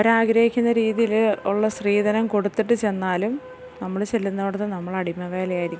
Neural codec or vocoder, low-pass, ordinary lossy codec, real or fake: none; none; none; real